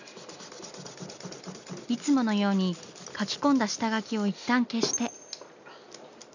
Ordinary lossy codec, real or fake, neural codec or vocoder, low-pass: none; real; none; 7.2 kHz